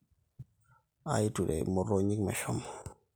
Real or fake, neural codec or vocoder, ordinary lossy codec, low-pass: real; none; none; none